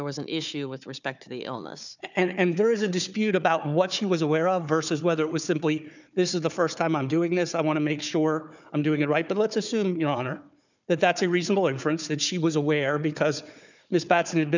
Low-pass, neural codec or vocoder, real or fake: 7.2 kHz; codec, 16 kHz, 4 kbps, FunCodec, trained on Chinese and English, 50 frames a second; fake